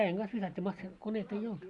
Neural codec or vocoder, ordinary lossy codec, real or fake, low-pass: none; none; real; 10.8 kHz